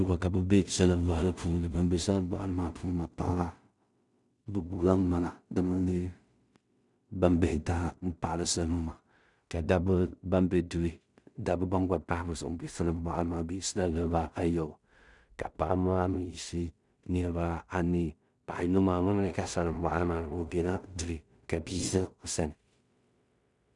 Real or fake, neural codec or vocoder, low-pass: fake; codec, 16 kHz in and 24 kHz out, 0.4 kbps, LongCat-Audio-Codec, two codebook decoder; 10.8 kHz